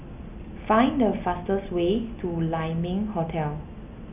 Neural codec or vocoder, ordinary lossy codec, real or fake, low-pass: none; none; real; 3.6 kHz